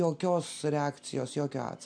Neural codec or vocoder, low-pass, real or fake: none; 9.9 kHz; real